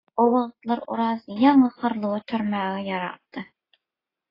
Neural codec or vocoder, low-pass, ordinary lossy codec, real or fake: none; 5.4 kHz; AAC, 24 kbps; real